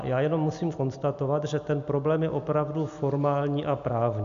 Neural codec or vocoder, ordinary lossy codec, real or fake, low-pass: none; MP3, 64 kbps; real; 7.2 kHz